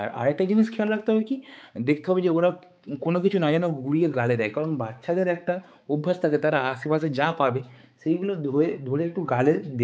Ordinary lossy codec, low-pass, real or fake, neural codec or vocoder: none; none; fake; codec, 16 kHz, 4 kbps, X-Codec, HuBERT features, trained on balanced general audio